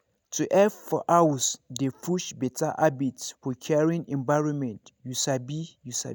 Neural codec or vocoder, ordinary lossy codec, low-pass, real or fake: none; none; none; real